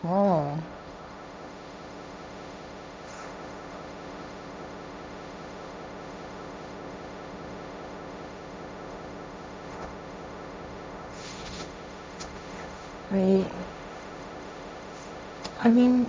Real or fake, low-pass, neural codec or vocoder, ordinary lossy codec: fake; none; codec, 16 kHz, 1.1 kbps, Voila-Tokenizer; none